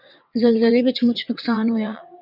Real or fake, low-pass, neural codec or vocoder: fake; 5.4 kHz; vocoder, 22.05 kHz, 80 mel bands, WaveNeXt